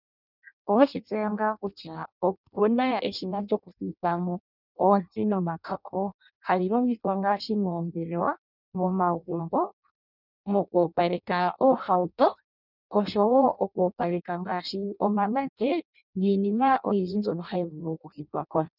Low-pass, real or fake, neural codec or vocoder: 5.4 kHz; fake; codec, 16 kHz in and 24 kHz out, 0.6 kbps, FireRedTTS-2 codec